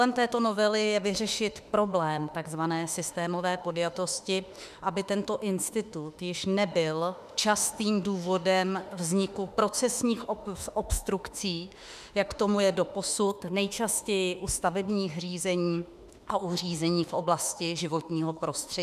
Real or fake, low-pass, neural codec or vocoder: fake; 14.4 kHz; autoencoder, 48 kHz, 32 numbers a frame, DAC-VAE, trained on Japanese speech